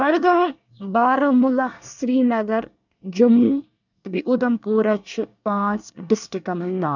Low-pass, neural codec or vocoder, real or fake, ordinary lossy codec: 7.2 kHz; codec, 24 kHz, 1 kbps, SNAC; fake; none